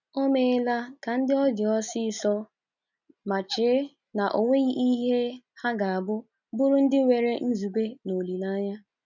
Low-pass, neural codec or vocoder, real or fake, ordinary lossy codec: 7.2 kHz; none; real; none